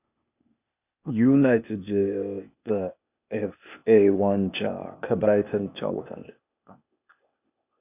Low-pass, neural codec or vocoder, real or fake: 3.6 kHz; codec, 16 kHz, 0.8 kbps, ZipCodec; fake